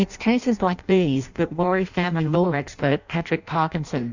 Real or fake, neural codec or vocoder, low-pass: fake; codec, 16 kHz in and 24 kHz out, 0.6 kbps, FireRedTTS-2 codec; 7.2 kHz